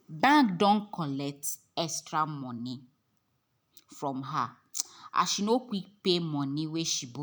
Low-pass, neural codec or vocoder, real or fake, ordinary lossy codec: none; none; real; none